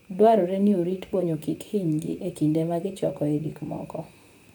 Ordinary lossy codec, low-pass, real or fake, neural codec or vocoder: none; none; fake; vocoder, 44.1 kHz, 128 mel bands, Pupu-Vocoder